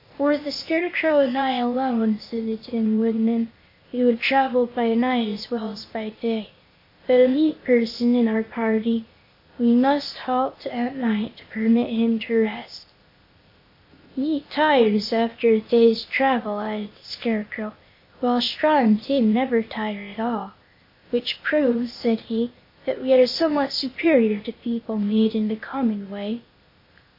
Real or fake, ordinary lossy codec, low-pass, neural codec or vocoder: fake; MP3, 32 kbps; 5.4 kHz; codec, 16 kHz, 0.8 kbps, ZipCodec